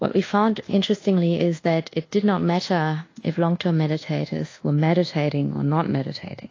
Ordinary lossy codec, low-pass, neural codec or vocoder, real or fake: AAC, 32 kbps; 7.2 kHz; codec, 24 kHz, 1.2 kbps, DualCodec; fake